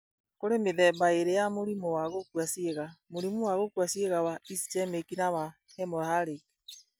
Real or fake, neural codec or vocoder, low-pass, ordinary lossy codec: real; none; none; none